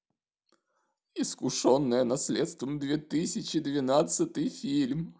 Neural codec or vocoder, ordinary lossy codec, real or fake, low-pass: none; none; real; none